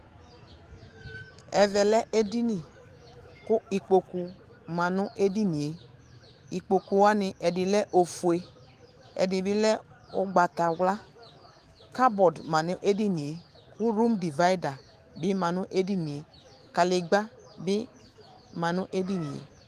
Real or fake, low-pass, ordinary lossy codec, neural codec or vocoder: fake; 14.4 kHz; Opus, 24 kbps; autoencoder, 48 kHz, 128 numbers a frame, DAC-VAE, trained on Japanese speech